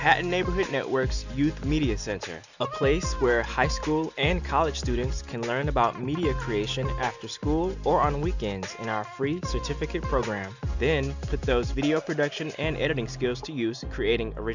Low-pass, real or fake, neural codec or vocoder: 7.2 kHz; real; none